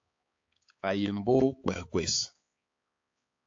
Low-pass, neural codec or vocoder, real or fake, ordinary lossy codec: 7.2 kHz; codec, 16 kHz, 4 kbps, X-Codec, HuBERT features, trained on balanced general audio; fake; AAC, 48 kbps